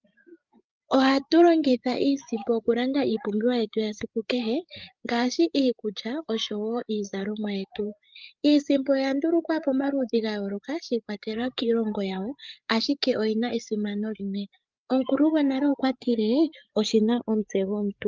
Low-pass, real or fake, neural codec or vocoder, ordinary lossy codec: 7.2 kHz; fake; codec, 16 kHz, 8 kbps, FreqCodec, larger model; Opus, 32 kbps